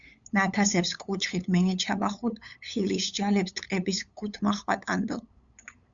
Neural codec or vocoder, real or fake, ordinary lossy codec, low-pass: codec, 16 kHz, 8 kbps, FunCodec, trained on LibriTTS, 25 frames a second; fake; Opus, 64 kbps; 7.2 kHz